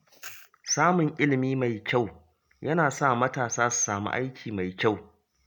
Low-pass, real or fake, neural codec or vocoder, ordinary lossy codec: none; real; none; none